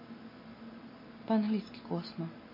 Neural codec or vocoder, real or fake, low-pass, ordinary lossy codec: none; real; 5.4 kHz; MP3, 24 kbps